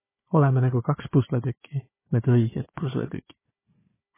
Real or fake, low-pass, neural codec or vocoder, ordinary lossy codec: fake; 3.6 kHz; codec, 16 kHz, 4 kbps, FunCodec, trained on Chinese and English, 50 frames a second; MP3, 16 kbps